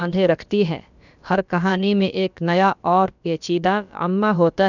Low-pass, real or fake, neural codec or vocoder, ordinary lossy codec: 7.2 kHz; fake; codec, 16 kHz, about 1 kbps, DyCAST, with the encoder's durations; none